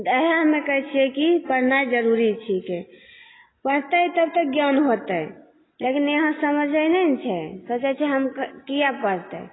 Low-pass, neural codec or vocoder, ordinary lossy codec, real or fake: 7.2 kHz; none; AAC, 16 kbps; real